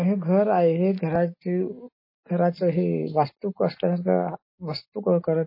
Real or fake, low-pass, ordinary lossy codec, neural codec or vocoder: real; 5.4 kHz; MP3, 24 kbps; none